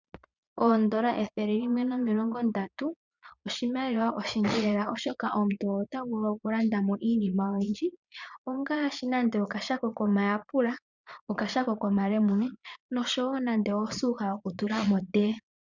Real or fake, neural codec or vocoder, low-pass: fake; vocoder, 22.05 kHz, 80 mel bands, Vocos; 7.2 kHz